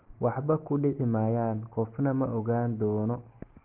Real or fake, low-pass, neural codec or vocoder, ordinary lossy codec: real; 3.6 kHz; none; Opus, 16 kbps